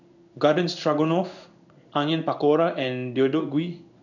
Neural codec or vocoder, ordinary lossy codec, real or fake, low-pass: codec, 16 kHz in and 24 kHz out, 1 kbps, XY-Tokenizer; none; fake; 7.2 kHz